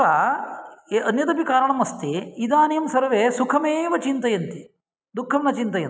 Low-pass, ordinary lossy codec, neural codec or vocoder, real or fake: none; none; none; real